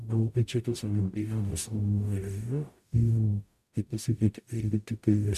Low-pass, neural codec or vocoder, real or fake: 14.4 kHz; codec, 44.1 kHz, 0.9 kbps, DAC; fake